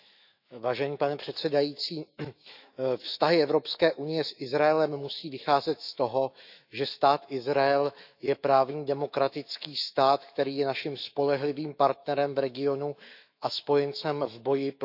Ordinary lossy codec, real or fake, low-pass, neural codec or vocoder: none; fake; 5.4 kHz; autoencoder, 48 kHz, 128 numbers a frame, DAC-VAE, trained on Japanese speech